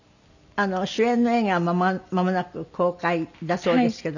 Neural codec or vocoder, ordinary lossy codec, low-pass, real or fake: none; none; 7.2 kHz; real